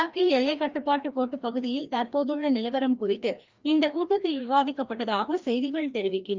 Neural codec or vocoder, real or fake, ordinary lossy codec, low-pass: codec, 16 kHz, 1 kbps, FreqCodec, larger model; fake; Opus, 24 kbps; 7.2 kHz